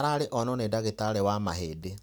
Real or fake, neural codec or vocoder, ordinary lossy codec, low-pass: real; none; none; none